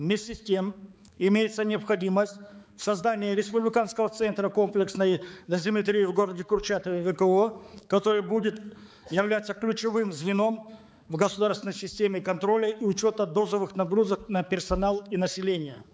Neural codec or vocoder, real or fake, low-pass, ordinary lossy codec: codec, 16 kHz, 4 kbps, X-Codec, HuBERT features, trained on balanced general audio; fake; none; none